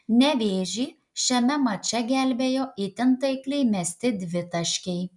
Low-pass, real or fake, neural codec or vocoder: 10.8 kHz; real; none